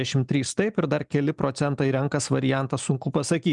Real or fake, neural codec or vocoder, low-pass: real; none; 10.8 kHz